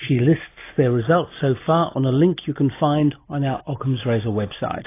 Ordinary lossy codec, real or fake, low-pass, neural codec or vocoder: AAC, 24 kbps; real; 3.6 kHz; none